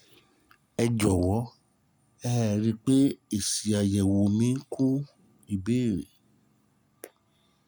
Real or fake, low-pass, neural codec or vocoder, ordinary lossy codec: real; none; none; none